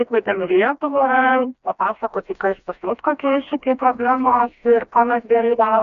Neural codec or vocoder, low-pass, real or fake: codec, 16 kHz, 1 kbps, FreqCodec, smaller model; 7.2 kHz; fake